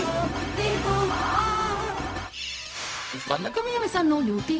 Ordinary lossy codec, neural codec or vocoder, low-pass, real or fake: none; codec, 16 kHz, 0.4 kbps, LongCat-Audio-Codec; none; fake